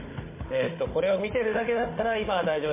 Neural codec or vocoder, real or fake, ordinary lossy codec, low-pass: codec, 16 kHz, 16 kbps, FunCodec, trained on Chinese and English, 50 frames a second; fake; MP3, 16 kbps; 3.6 kHz